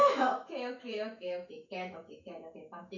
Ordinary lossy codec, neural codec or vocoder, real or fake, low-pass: none; autoencoder, 48 kHz, 128 numbers a frame, DAC-VAE, trained on Japanese speech; fake; 7.2 kHz